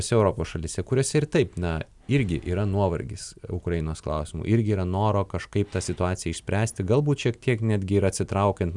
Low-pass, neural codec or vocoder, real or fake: 10.8 kHz; none; real